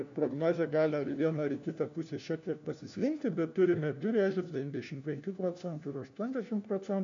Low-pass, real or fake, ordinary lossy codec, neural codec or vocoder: 7.2 kHz; fake; AAC, 64 kbps; codec, 16 kHz, 1 kbps, FunCodec, trained on LibriTTS, 50 frames a second